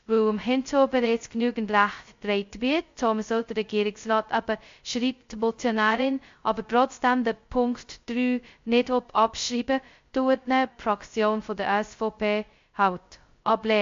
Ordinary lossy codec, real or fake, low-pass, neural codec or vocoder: MP3, 48 kbps; fake; 7.2 kHz; codec, 16 kHz, 0.2 kbps, FocalCodec